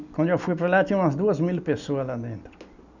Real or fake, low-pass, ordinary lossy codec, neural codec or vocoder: real; 7.2 kHz; none; none